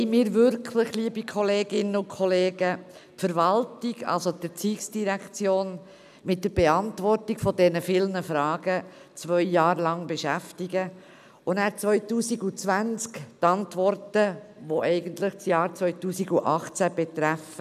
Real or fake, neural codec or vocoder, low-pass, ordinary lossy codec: real; none; 14.4 kHz; none